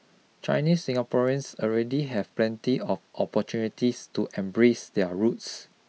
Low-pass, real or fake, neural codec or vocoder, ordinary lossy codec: none; real; none; none